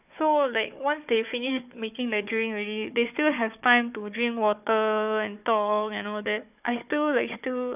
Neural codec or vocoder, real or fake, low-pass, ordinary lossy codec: codec, 16 kHz, 4 kbps, FunCodec, trained on Chinese and English, 50 frames a second; fake; 3.6 kHz; none